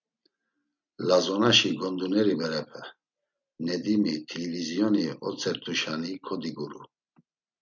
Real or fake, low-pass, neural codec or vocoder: real; 7.2 kHz; none